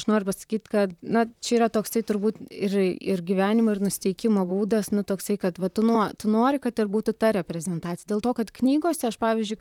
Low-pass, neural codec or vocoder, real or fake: 19.8 kHz; vocoder, 44.1 kHz, 128 mel bands, Pupu-Vocoder; fake